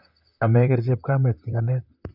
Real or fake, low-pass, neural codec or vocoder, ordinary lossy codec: fake; 5.4 kHz; vocoder, 44.1 kHz, 128 mel bands, Pupu-Vocoder; none